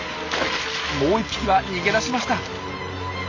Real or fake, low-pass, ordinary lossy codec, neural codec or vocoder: real; 7.2 kHz; AAC, 48 kbps; none